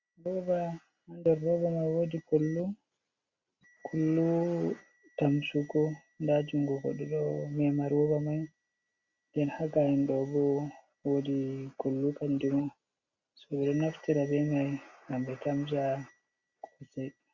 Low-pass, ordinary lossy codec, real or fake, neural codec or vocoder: 7.2 kHz; Opus, 64 kbps; real; none